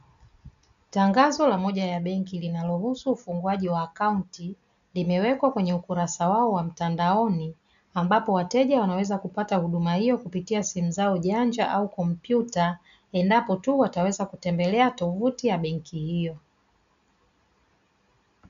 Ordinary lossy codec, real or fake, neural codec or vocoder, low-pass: MP3, 96 kbps; real; none; 7.2 kHz